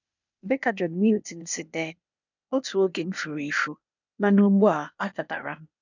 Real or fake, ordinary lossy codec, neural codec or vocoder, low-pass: fake; none; codec, 16 kHz, 0.8 kbps, ZipCodec; 7.2 kHz